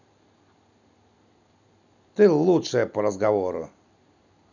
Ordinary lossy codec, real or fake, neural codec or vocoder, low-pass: none; real; none; 7.2 kHz